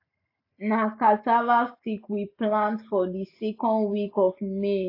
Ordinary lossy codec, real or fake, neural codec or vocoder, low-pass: AAC, 32 kbps; real; none; 5.4 kHz